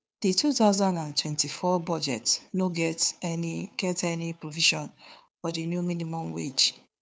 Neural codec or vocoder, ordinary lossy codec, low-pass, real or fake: codec, 16 kHz, 2 kbps, FunCodec, trained on Chinese and English, 25 frames a second; none; none; fake